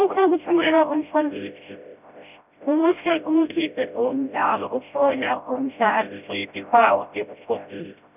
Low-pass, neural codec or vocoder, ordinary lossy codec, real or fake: 3.6 kHz; codec, 16 kHz, 0.5 kbps, FreqCodec, smaller model; none; fake